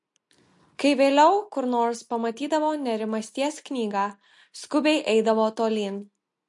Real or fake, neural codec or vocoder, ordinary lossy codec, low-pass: real; none; MP3, 48 kbps; 10.8 kHz